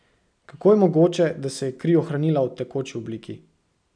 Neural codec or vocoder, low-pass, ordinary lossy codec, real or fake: none; 9.9 kHz; none; real